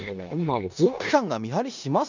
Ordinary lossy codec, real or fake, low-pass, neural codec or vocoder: none; fake; 7.2 kHz; codec, 16 kHz in and 24 kHz out, 0.9 kbps, LongCat-Audio-Codec, four codebook decoder